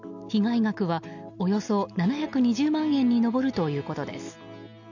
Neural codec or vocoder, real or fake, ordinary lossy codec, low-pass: none; real; none; 7.2 kHz